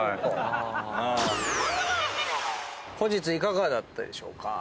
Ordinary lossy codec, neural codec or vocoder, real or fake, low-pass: none; none; real; none